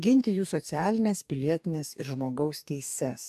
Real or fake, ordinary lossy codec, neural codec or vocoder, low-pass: fake; AAC, 96 kbps; codec, 44.1 kHz, 2.6 kbps, DAC; 14.4 kHz